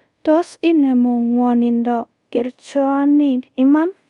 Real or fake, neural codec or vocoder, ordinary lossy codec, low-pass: fake; codec, 24 kHz, 0.5 kbps, DualCodec; Opus, 64 kbps; 10.8 kHz